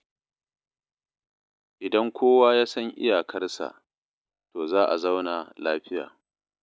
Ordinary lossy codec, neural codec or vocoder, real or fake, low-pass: none; none; real; none